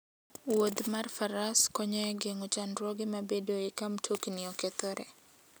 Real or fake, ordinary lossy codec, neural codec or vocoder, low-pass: real; none; none; none